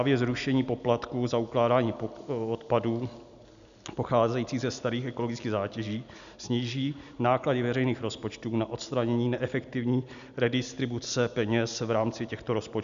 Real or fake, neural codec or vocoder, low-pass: real; none; 7.2 kHz